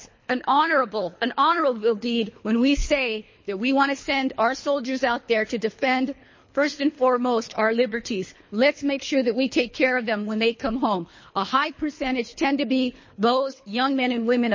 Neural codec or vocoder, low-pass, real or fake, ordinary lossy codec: codec, 24 kHz, 3 kbps, HILCodec; 7.2 kHz; fake; MP3, 32 kbps